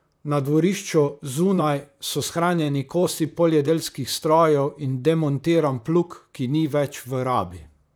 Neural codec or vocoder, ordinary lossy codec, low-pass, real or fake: vocoder, 44.1 kHz, 128 mel bands, Pupu-Vocoder; none; none; fake